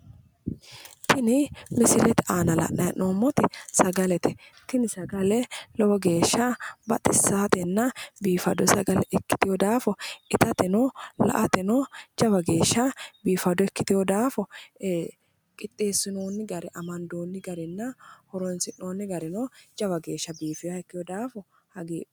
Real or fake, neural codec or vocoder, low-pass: real; none; 19.8 kHz